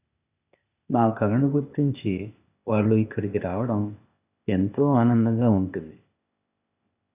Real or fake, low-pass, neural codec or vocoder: fake; 3.6 kHz; codec, 16 kHz, 0.8 kbps, ZipCodec